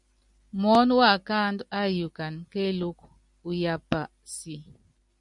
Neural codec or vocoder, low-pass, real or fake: none; 10.8 kHz; real